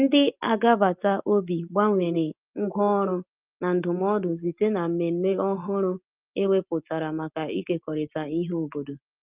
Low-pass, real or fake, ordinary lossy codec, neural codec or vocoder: 3.6 kHz; real; Opus, 24 kbps; none